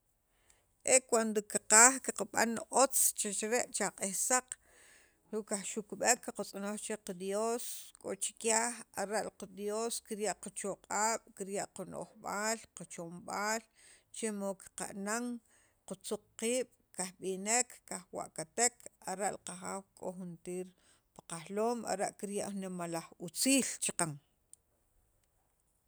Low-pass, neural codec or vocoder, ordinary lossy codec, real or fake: none; none; none; real